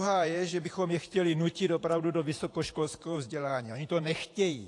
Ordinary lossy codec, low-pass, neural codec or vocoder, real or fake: AAC, 48 kbps; 10.8 kHz; vocoder, 48 kHz, 128 mel bands, Vocos; fake